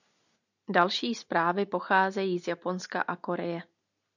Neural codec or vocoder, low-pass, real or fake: none; 7.2 kHz; real